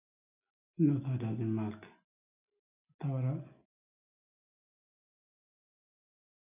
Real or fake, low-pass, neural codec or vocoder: real; 3.6 kHz; none